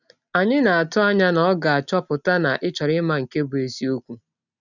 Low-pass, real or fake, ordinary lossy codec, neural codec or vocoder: 7.2 kHz; real; none; none